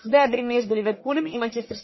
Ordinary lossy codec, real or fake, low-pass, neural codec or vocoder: MP3, 24 kbps; fake; 7.2 kHz; codec, 44.1 kHz, 1.7 kbps, Pupu-Codec